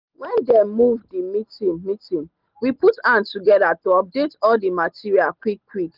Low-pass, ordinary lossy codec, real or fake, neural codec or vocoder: 5.4 kHz; Opus, 32 kbps; real; none